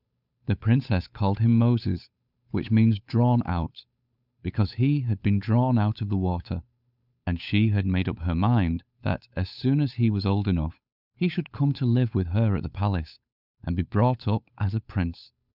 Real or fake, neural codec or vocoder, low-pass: fake; codec, 16 kHz, 8 kbps, FunCodec, trained on Chinese and English, 25 frames a second; 5.4 kHz